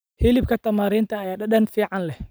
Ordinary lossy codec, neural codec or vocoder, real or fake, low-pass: none; none; real; none